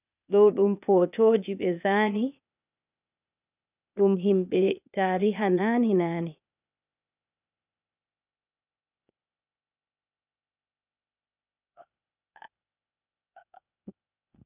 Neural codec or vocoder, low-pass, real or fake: codec, 16 kHz, 0.8 kbps, ZipCodec; 3.6 kHz; fake